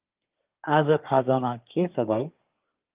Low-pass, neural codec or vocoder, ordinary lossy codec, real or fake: 3.6 kHz; codec, 24 kHz, 1 kbps, SNAC; Opus, 24 kbps; fake